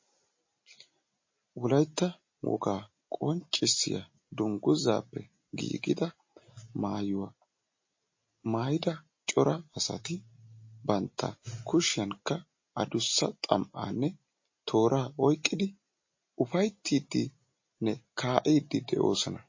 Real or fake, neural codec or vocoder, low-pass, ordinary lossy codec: real; none; 7.2 kHz; MP3, 32 kbps